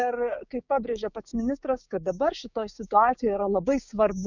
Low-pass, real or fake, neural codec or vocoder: 7.2 kHz; fake; codec, 16 kHz, 6 kbps, DAC